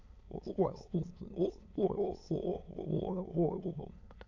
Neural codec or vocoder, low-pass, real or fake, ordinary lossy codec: autoencoder, 22.05 kHz, a latent of 192 numbers a frame, VITS, trained on many speakers; 7.2 kHz; fake; MP3, 64 kbps